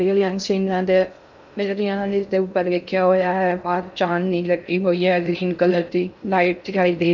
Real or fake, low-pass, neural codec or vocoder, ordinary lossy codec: fake; 7.2 kHz; codec, 16 kHz in and 24 kHz out, 0.6 kbps, FocalCodec, streaming, 2048 codes; none